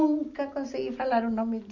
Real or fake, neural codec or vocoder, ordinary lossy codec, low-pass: real; none; Opus, 64 kbps; 7.2 kHz